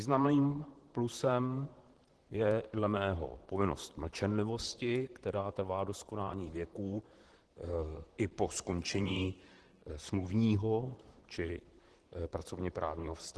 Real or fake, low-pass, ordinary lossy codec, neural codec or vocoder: fake; 10.8 kHz; Opus, 16 kbps; vocoder, 44.1 kHz, 128 mel bands, Pupu-Vocoder